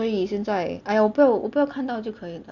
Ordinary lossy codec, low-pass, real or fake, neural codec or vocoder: Opus, 64 kbps; 7.2 kHz; real; none